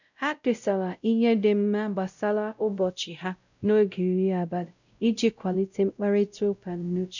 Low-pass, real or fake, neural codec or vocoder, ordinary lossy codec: 7.2 kHz; fake; codec, 16 kHz, 0.5 kbps, X-Codec, WavLM features, trained on Multilingual LibriSpeech; none